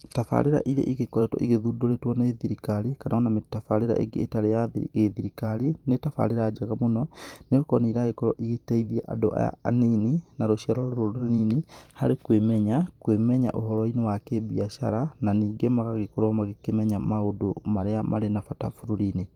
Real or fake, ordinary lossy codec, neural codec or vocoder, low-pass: fake; Opus, 32 kbps; vocoder, 44.1 kHz, 128 mel bands every 512 samples, BigVGAN v2; 19.8 kHz